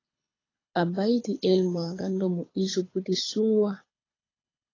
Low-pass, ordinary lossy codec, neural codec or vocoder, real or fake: 7.2 kHz; AAC, 32 kbps; codec, 24 kHz, 6 kbps, HILCodec; fake